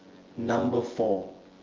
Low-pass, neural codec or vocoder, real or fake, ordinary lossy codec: 7.2 kHz; vocoder, 24 kHz, 100 mel bands, Vocos; fake; Opus, 16 kbps